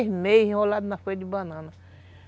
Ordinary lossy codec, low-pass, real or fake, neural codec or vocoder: none; none; real; none